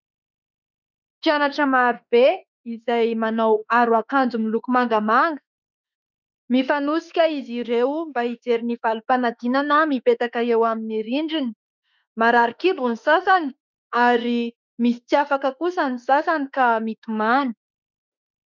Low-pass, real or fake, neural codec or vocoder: 7.2 kHz; fake; autoencoder, 48 kHz, 32 numbers a frame, DAC-VAE, trained on Japanese speech